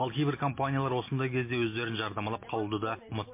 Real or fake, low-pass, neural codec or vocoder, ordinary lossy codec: real; 3.6 kHz; none; MP3, 24 kbps